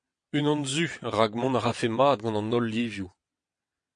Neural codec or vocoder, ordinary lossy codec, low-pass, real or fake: vocoder, 22.05 kHz, 80 mel bands, WaveNeXt; MP3, 48 kbps; 9.9 kHz; fake